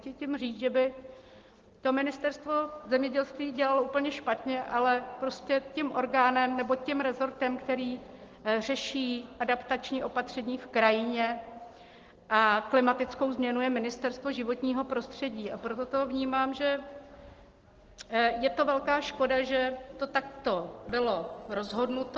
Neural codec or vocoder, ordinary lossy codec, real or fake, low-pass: none; Opus, 16 kbps; real; 7.2 kHz